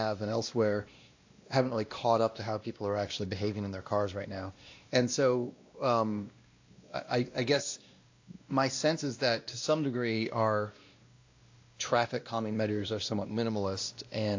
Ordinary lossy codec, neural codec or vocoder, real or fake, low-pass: AAC, 48 kbps; codec, 16 kHz, 1 kbps, X-Codec, WavLM features, trained on Multilingual LibriSpeech; fake; 7.2 kHz